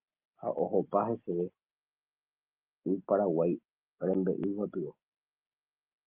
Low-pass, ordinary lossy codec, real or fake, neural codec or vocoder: 3.6 kHz; Opus, 32 kbps; real; none